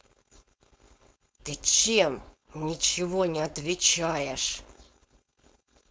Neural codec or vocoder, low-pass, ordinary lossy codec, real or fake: codec, 16 kHz, 4.8 kbps, FACodec; none; none; fake